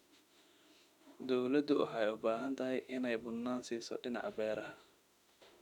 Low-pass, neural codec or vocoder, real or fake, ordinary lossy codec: 19.8 kHz; autoencoder, 48 kHz, 32 numbers a frame, DAC-VAE, trained on Japanese speech; fake; none